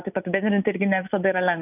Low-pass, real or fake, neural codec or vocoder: 3.6 kHz; real; none